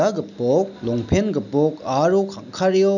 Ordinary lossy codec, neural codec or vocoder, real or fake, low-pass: none; none; real; 7.2 kHz